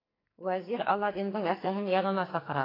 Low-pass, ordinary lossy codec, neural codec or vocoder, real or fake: 5.4 kHz; AAC, 24 kbps; codec, 44.1 kHz, 2.6 kbps, SNAC; fake